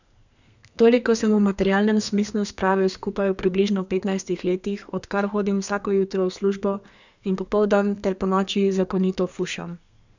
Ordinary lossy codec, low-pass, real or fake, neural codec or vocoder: none; 7.2 kHz; fake; codec, 32 kHz, 1.9 kbps, SNAC